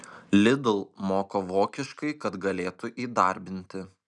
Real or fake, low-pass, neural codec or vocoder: real; 10.8 kHz; none